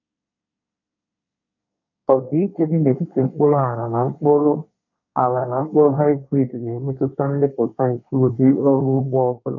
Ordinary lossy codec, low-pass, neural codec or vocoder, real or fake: none; 7.2 kHz; codec, 24 kHz, 1 kbps, SNAC; fake